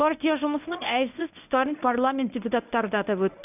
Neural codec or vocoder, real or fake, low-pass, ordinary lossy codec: codec, 24 kHz, 0.9 kbps, WavTokenizer, medium speech release version 1; fake; 3.6 kHz; none